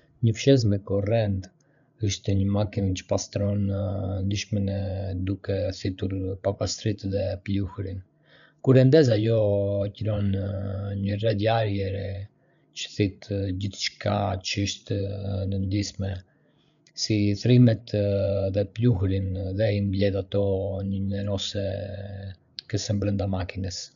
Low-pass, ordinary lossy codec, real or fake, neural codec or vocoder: 7.2 kHz; none; fake; codec, 16 kHz, 8 kbps, FreqCodec, larger model